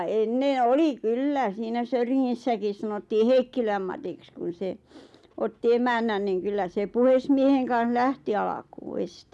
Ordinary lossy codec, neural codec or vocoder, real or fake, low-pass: none; none; real; none